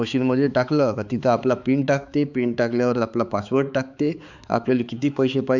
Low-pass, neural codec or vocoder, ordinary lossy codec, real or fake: 7.2 kHz; codec, 16 kHz, 4 kbps, X-Codec, HuBERT features, trained on balanced general audio; none; fake